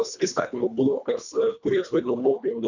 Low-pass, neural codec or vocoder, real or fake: 7.2 kHz; codec, 24 kHz, 1.5 kbps, HILCodec; fake